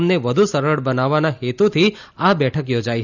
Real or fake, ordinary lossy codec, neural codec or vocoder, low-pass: real; none; none; 7.2 kHz